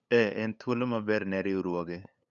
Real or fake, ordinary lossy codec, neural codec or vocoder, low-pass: fake; none; codec, 16 kHz, 8 kbps, FunCodec, trained on LibriTTS, 25 frames a second; 7.2 kHz